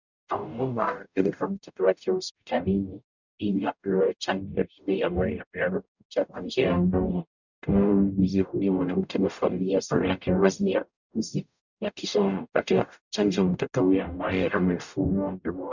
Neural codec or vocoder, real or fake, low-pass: codec, 44.1 kHz, 0.9 kbps, DAC; fake; 7.2 kHz